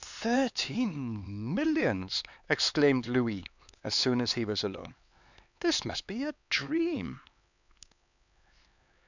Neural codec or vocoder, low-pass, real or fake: codec, 16 kHz, 4 kbps, X-Codec, HuBERT features, trained on LibriSpeech; 7.2 kHz; fake